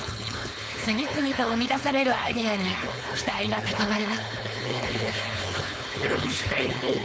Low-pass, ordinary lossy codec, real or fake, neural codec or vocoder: none; none; fake; codec, 16 kHz, 4.8 kbps, FACodec